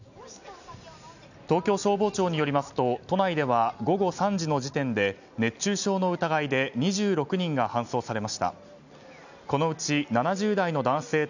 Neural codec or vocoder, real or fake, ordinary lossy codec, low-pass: none; real; none; 7.2 kHz